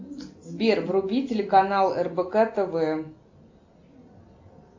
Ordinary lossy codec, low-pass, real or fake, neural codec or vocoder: AAC, 48 kbps; 7.2 kHz; real; none